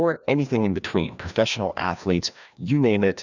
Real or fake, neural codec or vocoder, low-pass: fake; codec, 16 kHz, 1 kbps, FreqCodec, larger model; 7.2 kHz